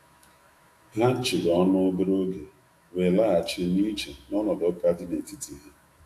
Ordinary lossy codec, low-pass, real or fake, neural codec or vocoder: AAC, 64 kbps; 14.4 kHz; fake; autoencoder, 48 kHz, 128 numbers a frame, DAC-VAE, trained on Japanese speech